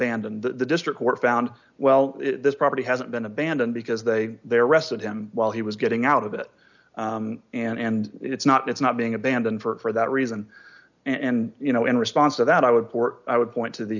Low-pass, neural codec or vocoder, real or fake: 7.2 kHz; none; real